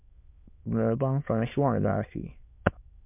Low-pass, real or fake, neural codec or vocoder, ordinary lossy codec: 3.6 kHz; fake; autoencoder, 22.05 kHz, a latent of 192 numbers a frame, VITS, trained on many speakers; MP3, 32 kbps